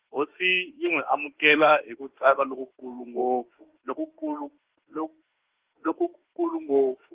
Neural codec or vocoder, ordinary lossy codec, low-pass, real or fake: autoencoder, 48 kHz, 32 numbers a frame, DAC-VAE, trained on Japanese speech; Opus, 16 kbps; 3.6 kHz; fake